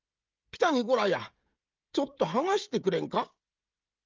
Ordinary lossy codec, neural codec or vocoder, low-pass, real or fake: Opus, 32 kbps; codec, 16 kHz, 16 kbps, FreqCodec, smaller model; 7.2 kHz; fake